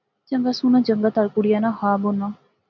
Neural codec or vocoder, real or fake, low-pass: none; real; 7.2 kHz